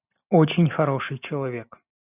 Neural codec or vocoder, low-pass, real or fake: none; 3.6 kHz; real